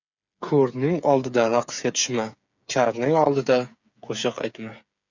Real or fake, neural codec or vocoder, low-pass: fake; codec, 16 kHz, 8 kbps, FreqCodec, smaller model; 7.2 kHz